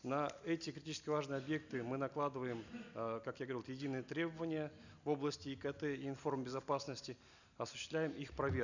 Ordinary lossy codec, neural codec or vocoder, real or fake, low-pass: none; none; real; 7.2 kHz